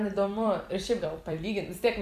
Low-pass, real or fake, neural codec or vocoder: 14.4 kHz; fake; vocoder, 44.1 kHz, 128 mel bands every 256 samples, BigVGAN v2